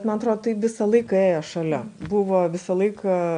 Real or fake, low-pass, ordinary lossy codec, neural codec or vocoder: real; 9.9 kHz; MP3, 64 kbps; none